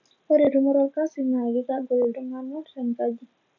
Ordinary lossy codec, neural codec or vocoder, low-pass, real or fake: none; none; 7.2 kHz; real